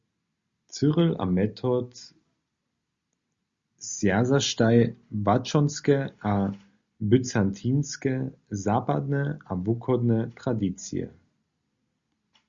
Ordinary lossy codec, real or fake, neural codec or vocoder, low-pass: Opus, 64 kbps; real; none; 7.2 kHz